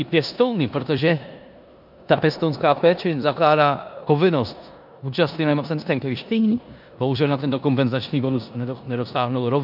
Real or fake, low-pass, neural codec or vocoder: fake; 5.4 kHz; codec, 16 kHz in and 24 kHz out, 0.9 kbps, LongCat-Audio-Codec, four codebook decoder